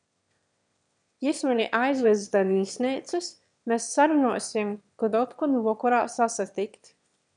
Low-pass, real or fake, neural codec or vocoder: 9.9 kHz; fake; autoencoder, 22.05 kHz, a latent of 192 numbers a frame, VITS, trained on one speaker